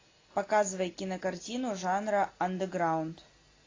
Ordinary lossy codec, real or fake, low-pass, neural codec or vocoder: AAC, 32 kbps; real; 7.2 kHz; none